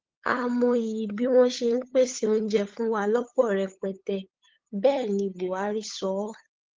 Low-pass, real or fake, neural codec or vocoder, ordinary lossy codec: 7.2 kHz; fake; codec, 16 kHz, 8 kbps, FunCodec, trained on LibriTTS, 25 frames a second; Opus, 16 kbps